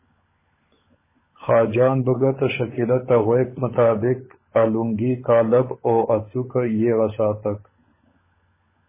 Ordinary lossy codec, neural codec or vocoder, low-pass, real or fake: MP3, 16 kbps; codec, 16 kHz, 16 kbps, FunCodec, trained on LibriTTS, 50 frames a second; 3.6 kHz; fake